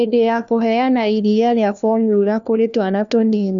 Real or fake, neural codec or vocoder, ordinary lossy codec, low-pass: fake; codec, 16 kHz, 1 kbps, FunCodec, trained on LibriTTS, 50 frames a second; none; 7.2 kHz